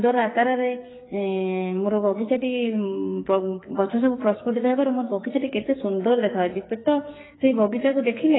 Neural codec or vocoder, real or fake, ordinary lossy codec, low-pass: codec, 44.1 kHz, 2.6 kbps, SNAC; fake; AAC, 16 kbps; 7.2 kHz